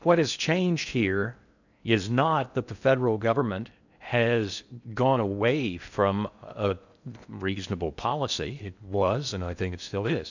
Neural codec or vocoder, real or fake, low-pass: codec, 16 kHz in and 24 kHz out, 0.6 kbps, FocalCodec, streaming, 2048 codes; fake; 7.2 kHz